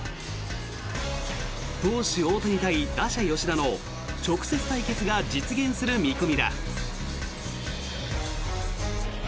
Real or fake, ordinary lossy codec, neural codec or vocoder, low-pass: real; none; none; none